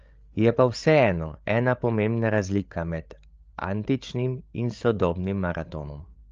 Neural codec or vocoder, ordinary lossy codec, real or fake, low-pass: codec, 16 kHz, 8 kbps, FreqCodec, larger model; Opus, 24 kbps; fake; 7.2 kHz